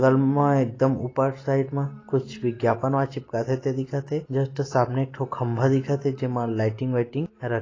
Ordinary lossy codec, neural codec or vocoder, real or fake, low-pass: AAC, 32 kbps; none; real; 7.2 kHz